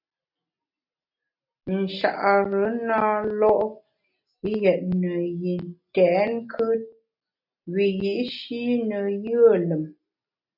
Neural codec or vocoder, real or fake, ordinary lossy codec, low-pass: none; real; MP3, 48 kbps; 5.4 kHz